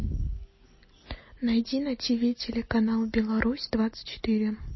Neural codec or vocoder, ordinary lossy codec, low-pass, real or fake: none; MP3, 24 kbps; 7.2 kHz; real